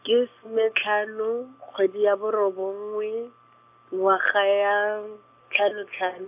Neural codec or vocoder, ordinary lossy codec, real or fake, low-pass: none; AAC, 32 kbps; real; 3.6 kHz